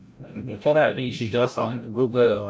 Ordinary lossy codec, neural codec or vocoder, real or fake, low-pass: none; codec, 16 kHz, 0.5 kbps, FreqCodec, larger model; fake; none